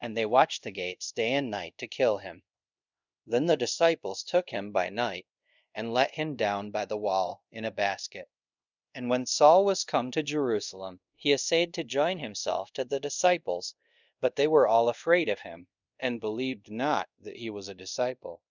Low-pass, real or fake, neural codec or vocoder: 7.2 kHz; fake; codec, 24 kHz, 0.5 kbps, DualCodec